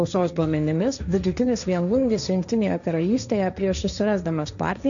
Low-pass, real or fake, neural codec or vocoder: 7.2 kHz; fake; codec, 16 kHz, 1.1 kbps, Voila-Tokenizer